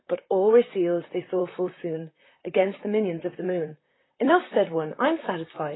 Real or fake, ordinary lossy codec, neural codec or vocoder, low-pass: fake; AAC, 16 kbps; vocoder, 44.1 kHz, 128 mel bands, Pupu-Vocoder; 7.2 kHz